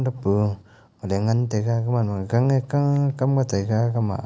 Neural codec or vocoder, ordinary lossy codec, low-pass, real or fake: none; none; none; real